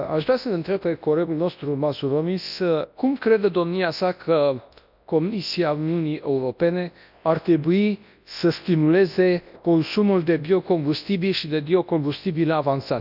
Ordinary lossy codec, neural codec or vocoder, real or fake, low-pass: none; codec, 24 kHz, 0.9 kbps, WavTokenizer, large speech release; fake; 5.4 kHz